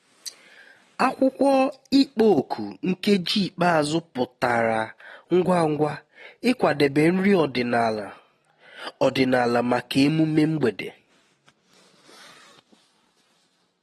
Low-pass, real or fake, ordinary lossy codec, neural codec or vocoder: 19.8 kHz; real; AAC, 32 kbps; none